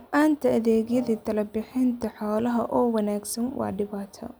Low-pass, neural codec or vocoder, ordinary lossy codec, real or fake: none; none; none; real